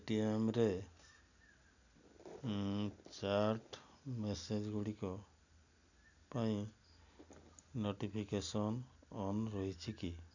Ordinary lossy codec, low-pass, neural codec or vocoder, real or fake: none; 7.2 kHz; none; real